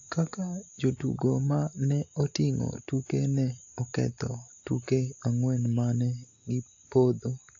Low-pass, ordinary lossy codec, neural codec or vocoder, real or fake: 7.2 kHz; AAC, 48 kbps; none; real